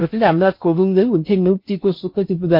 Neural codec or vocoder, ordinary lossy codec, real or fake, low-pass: codec, 16 kHz in and 24 kHz out, 0.6 kbps, FocalCodec, streaming, 4096 codes; MP3, 32 kbps; fake; 5.4 kHz